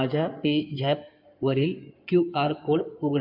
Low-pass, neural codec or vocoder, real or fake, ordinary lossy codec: 5.4 kHz; codec, 16 kHz in and 24 kHz out, 2.2 kbps, FireRedTTS-2 codec; fake; none